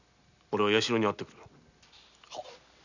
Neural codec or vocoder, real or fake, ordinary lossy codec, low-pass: none; real; none; 7.2 kHz